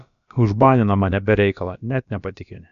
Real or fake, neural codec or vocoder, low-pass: fake; codec, 16 kHz, about 1 kbps, DyCAST, with the encoder's durations; 7.2 kHz